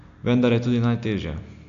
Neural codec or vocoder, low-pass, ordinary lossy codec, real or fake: none; 7.2 kHz; AAC, 64 kbps; real